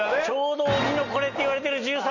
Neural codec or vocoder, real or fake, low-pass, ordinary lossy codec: none; real; 7.2 kHz; none